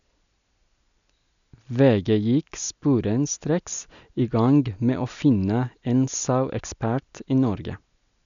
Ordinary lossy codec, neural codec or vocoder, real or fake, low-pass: MP3, 96 kbps; none; real; 7.2 kHz